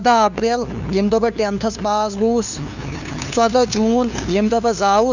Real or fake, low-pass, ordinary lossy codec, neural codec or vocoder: fake; 7.2 kHz; none; codec, 16 kHz, 2 kbps, FunCodec, trained on LibriTTS, 25 frames a second